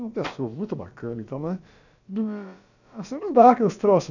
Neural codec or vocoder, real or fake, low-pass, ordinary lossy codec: codec, 16 kHz, about 1 kbps, DyCAST, with the encoder's durations; fake; 7.2 kHz; none